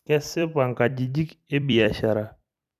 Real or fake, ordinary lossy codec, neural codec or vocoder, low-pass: fake; none; vocoder, 44.1 kHz, 128 mel bands every 256 samples, BigVGAN v2; 14.4 kHz